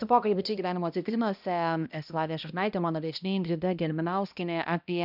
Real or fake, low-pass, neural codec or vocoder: fake; 5.4 kHz; codec, 16 kHz, 1 kbps, X-Codec, HuBERT features, trained on balanced general audio